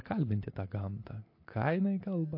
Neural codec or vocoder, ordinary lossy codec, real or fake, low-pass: none; MP3, 32 kbps; real; 5.4 kHz